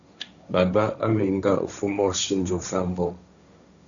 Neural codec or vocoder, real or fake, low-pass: codec, 16 kHz, 1.1 kbps, Voila-Tokenizer; fake; 7.2 kHz